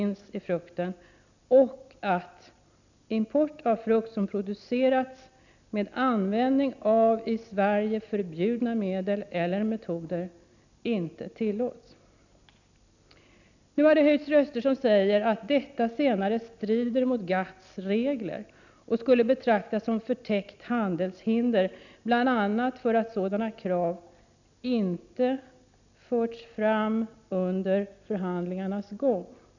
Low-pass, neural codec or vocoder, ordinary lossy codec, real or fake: 7.2 kHz; none; none; real